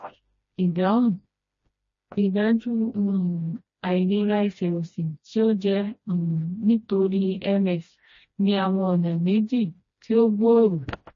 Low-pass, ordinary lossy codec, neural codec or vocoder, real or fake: 7.2 kHz; MP3, 32 kbps; codec, 16 kHz, 1 kbps, FreqCodec, smaller model; fake